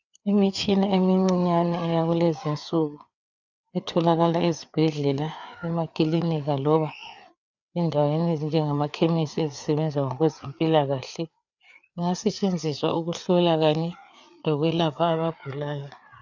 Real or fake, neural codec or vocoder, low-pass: fake; codec, 16 kHz, 4 kbps, FreqCodec, larger model; 7.2 kHz